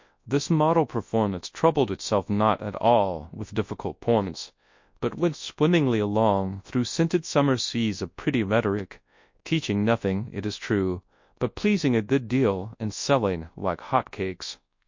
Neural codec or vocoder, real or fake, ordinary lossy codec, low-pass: codec, 24 kHz, 0.9 kbps, WavTokenizer, large speech release; fake; MP3, 48 kbps; 7.2 kHz